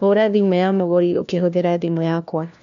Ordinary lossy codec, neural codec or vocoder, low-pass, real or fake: none; codec, 16 kHz, 1 kbps, FunCodec, trained on LibriTTS, 50 frames a second; 7.2 kHz; fake